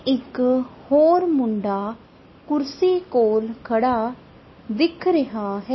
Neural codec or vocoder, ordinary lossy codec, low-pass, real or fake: none; MP3, 24 kbps; 7.2 kHz; real